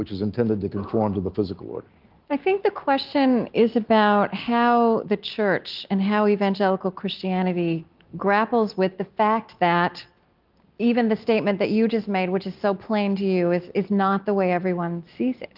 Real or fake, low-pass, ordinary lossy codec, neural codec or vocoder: real; 5.4 kHz; Opus, 24 kbps; none